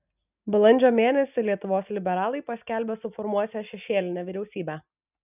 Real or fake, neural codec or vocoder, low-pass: real; none; 3.6 kHz